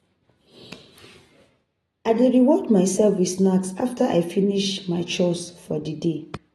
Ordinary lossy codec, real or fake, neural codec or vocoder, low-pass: AAC, 32 kbps; real; none; 19.8 kHz